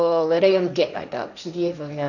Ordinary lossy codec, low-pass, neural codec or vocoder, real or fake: none; 7.2 kHz; codec, 16 kHz, 1.1 kbps, Voila-Tokenizer; fake